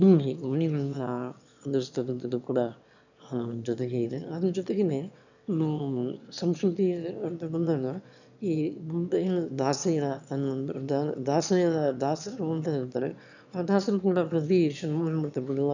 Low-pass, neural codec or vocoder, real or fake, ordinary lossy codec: 7.2 kHz; autoencoder, 22.05 kHz, a latent of 192 numbers a frame, VITS, trained on one speaker; fake; none